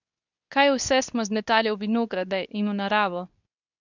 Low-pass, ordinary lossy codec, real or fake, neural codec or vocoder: 7.2 kHz; none; fake; codec, 24 kHz, 0.9 kbps, WavTokenizer, medium speech release version 2